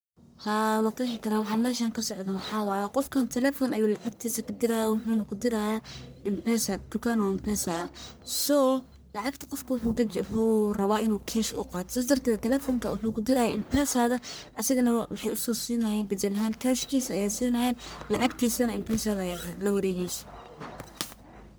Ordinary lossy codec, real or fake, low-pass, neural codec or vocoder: none; fake; none; codec, 44.1 kHz, 1.7 kbps, Pupu-Codec